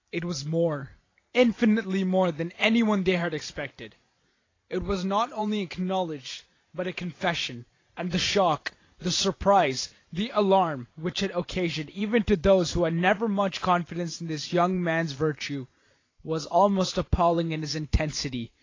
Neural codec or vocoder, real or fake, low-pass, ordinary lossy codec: vocoder, 44.1 kHz, 128 mel bands every 512 samples, BigVGAN v2; fake; 7.2 kHz; AAC, 32 kbps